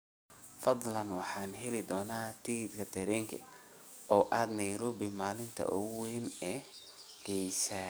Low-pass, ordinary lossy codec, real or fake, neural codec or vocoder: none; none; fake; codec, 44.1 kHz, 7.8 kbps, DAC